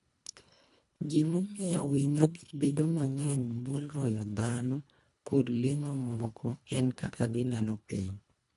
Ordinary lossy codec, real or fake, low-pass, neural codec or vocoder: AAC, 96 kbps; fake; 10.8 kHz; codec, 24 kHz, 1.5 kbps, HILCodec